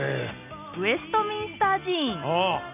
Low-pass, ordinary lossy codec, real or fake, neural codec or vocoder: 3.6 kHz; none; real; none